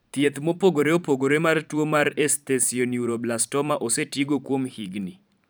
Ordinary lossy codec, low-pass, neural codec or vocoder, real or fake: none; none; vocoder, 44.1 kHz, 128 mel bands every 512 samples, BigVGAN v2; fake